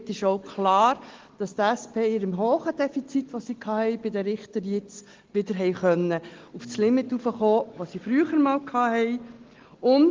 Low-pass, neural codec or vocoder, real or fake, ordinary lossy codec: 7.2 kHz; none; real; Opus, 16 kbps